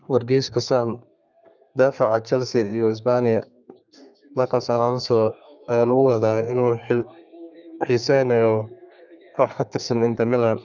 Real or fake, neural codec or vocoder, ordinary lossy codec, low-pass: fake; codec, 32 kHz, 1.9 kbps, SNAC; none; 7.2 kHz